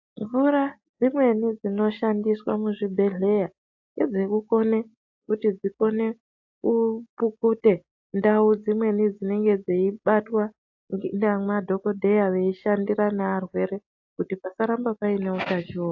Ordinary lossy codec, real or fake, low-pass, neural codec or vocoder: AAC, 48 kbps; real; 7.2 kHz; none